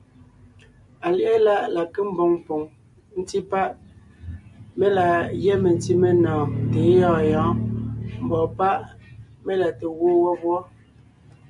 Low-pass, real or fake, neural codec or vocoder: 10.8 kHz; real; none